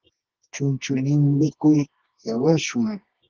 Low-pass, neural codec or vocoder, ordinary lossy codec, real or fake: 7.2 kHz; codec, 24 kHz, 0.9 kbps, WavTokenizer, medium music audio release; Opus, 16 kbps; fake